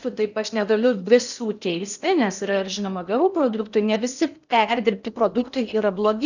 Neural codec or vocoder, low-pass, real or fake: codec, 16 kHz in and 24 kHz out, 0.8 kbps, FocalCodec, streaming, 65536 codes; 7.2 kHz; fake